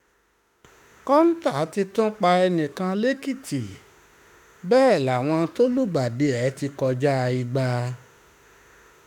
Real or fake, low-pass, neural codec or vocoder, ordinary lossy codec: fake; 19.8 kHz; autoencoder, 48 kHz, 32 numbers a frame, DAC-VAE, trained on Japanese speech; none